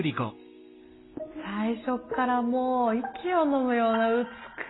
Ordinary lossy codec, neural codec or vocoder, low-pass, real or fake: AAC, 16 kbps; none; 7.2 kHz; real